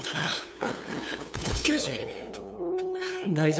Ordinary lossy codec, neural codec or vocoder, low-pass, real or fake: none; codec, 16 kHz, 2 kbps, FunCodec, trained on LibriTTS, 25 frames a second; none; fake